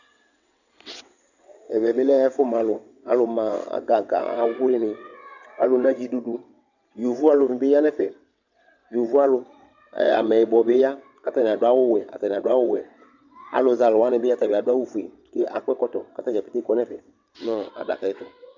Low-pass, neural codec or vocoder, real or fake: 7.2 kHz; vocoder, 22.05 kHz, 80 mel bands, WaveNeXt; fake